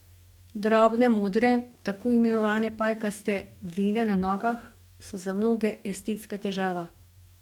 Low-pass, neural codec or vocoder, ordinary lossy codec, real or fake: 19.8 kHz; codec, 44.1 kHz, 2.6 kbps, DAC; none; fake